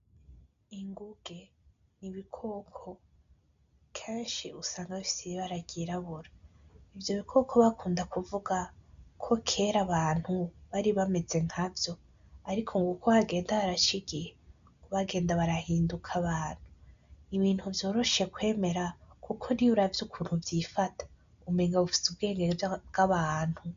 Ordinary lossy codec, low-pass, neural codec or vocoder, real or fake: MP3, 64 kbps; 7.2 kHz; none; real